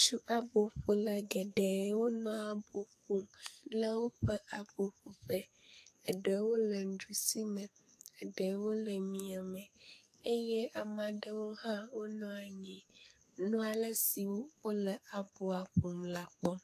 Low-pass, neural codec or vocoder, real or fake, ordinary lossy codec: 14.4 kHz; codec, 44.1 kHz, 2.6 kbps, SNAC; fake; AAC, 64 kbps